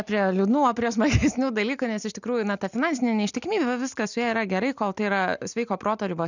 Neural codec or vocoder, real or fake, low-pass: none; real; 7.2 kHz